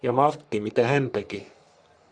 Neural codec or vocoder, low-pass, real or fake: codec, 44.1 kHz, 3.4 kbps, Pupu-Codec; 9.9 kHz; fake